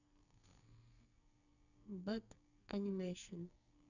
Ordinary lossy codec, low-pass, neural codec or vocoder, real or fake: none; 7.2 kHz; codec, 44.1 kHz, 2.6 kbps, SNAC; fake